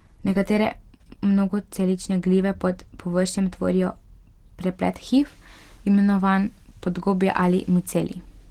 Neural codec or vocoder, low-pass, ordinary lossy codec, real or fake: none; 19.8 kHz; Opus, 16 kbps; real